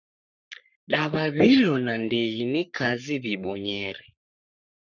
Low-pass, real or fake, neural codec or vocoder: 7.2 kHz; fake; codec, 44.1 kHz, 3.4 kbps, Pupu-Codec